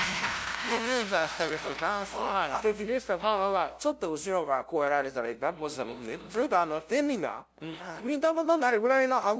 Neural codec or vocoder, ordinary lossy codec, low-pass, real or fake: codec, 16 kHz, 0.5 kbps, FunCodec, trained on LibriTTS, 25 frames a second; none; none; fake